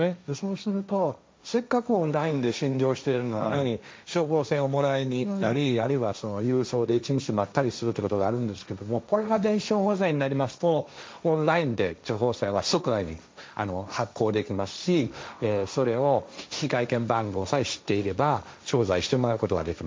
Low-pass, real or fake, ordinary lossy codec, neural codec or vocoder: none; fake; none; codec, 16 kHz, 1.1 kbps, Voila-Tokenizer